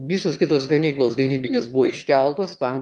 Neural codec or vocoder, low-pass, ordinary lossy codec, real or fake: autoencoder, 22.05 kHz, a latent of 192 numbers a frame, VITS, trained on one speaker; 9.9 kHz; Opus, 32 kbps; fake